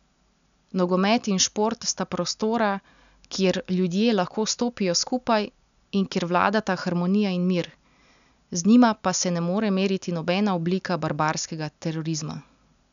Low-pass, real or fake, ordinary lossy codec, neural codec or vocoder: 7.2 kHz; real; none; none